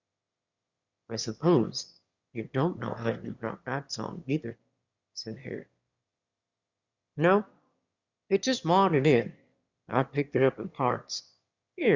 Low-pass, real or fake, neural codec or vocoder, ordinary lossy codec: 7.2 kHz; fake; autoencoder, 22.05 kHz, a latent of 192 numbers a frame, VITS, trained on one speaker; Opus, 64 kbps